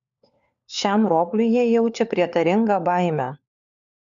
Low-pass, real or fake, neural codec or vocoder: 7.2 kHz; fake; codec, 16 kHz, 4 kbps, FunCodec, trained on LibriTTS, 50 frames a second